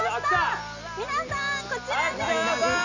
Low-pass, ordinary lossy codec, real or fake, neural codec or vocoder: 7.2 kHz; none; real; none